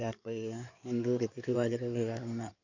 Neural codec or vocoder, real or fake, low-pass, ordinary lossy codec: codec, 16 kHz in and 24 kHz out, 2.2 kbps, FireRedTTS-2 codec; fake; 7.2 kHz; none